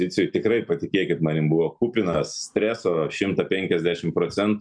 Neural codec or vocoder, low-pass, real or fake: none; 9.9 kHz; real